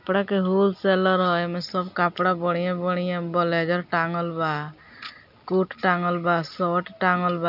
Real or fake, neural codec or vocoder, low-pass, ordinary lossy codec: real; none; 5.4 kHz; none